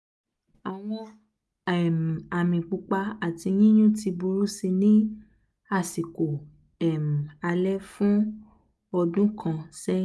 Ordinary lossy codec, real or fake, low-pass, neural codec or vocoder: none; real; none; none